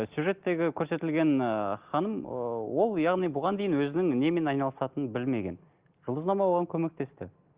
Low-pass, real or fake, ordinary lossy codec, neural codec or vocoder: 3.6 kHz; real; Opus, 32 kbps; none